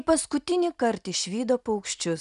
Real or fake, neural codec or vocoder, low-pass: real; none; 10.8 kHz